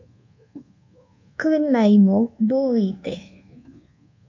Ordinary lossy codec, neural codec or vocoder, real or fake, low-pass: MP3, 48 kbps; codec, 24 kHz, 1.2 kbps, DualCodec; fake; 7.2 kHz